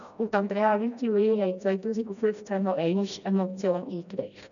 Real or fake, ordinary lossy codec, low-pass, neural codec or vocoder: fake; none; 7.2 kHz; codec, 16 kHz, 1 kbps, FreqCodec, smaller model